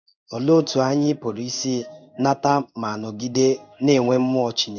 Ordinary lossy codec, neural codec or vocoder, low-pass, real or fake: none; codec, 16 kHz in and 24 kHz out, 1 kbps, XY-Tokenizer; 7.2 kHz; fake